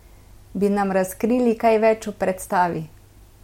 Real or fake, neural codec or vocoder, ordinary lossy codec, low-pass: real; none; MP3, 64 kbps; 19.8 kHz